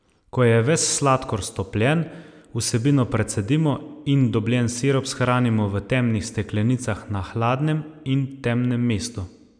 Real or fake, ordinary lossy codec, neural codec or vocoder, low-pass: real; none; none; 9.9 kHz